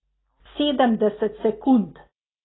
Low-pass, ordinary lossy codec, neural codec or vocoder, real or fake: 7.2 kHz; AAC, 16 kbps; none; real